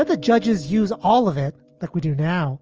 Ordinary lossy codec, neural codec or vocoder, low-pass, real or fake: Opus, 24 kbps; none; 7.2 kHz; real